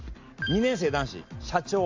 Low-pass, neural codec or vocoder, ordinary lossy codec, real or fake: 7.2 kHz; none; AAC, 48 kbps; real